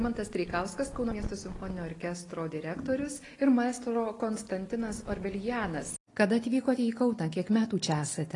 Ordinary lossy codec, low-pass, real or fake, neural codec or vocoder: AAC, 32 kbps; 10.8 kHz; real; none